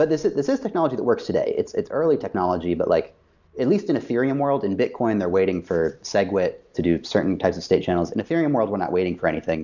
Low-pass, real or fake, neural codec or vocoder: 7.2 kHz; real; none